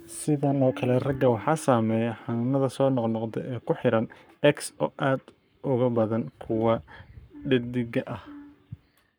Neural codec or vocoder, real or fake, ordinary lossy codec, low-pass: codec, 44.1 kHz, 7.8 kbps, Pupu-Codec; fake; none; none